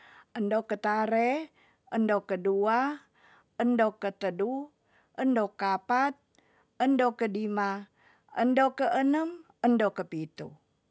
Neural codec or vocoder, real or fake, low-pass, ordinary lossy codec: none; real; none; none